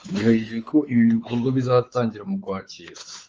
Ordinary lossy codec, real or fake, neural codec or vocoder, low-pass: Opus, 24 kbps; fake; codec, 16 kHz, 4 kbps, X-Codec, WavLM features, trained on Multilingual LibriSpeech; 7.2 kHz